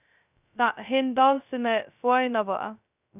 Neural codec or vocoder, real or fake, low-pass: codec, 16 kHz, 0.2 kbps, FocalCodec; fake; 3.6 kHz